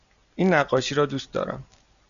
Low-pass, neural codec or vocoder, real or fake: 7.2 kHz; none; real